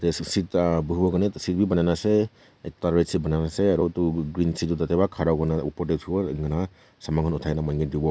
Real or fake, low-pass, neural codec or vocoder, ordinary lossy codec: real; none; none; none